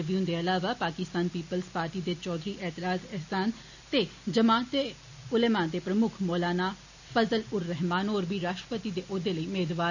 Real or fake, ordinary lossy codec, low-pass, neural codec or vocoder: real; none; 7.2 kHz; none